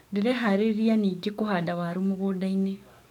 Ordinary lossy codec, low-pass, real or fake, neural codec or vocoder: none; 19.8 kHz; fake; codec, 44.1 kHz, 7.8 kbps, DAC